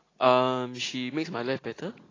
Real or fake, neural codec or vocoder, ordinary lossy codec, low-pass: real; none; AAC, 32 kbps; 7.2 kHz